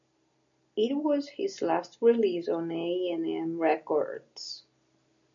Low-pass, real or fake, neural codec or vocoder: 7.2 kHz; real; none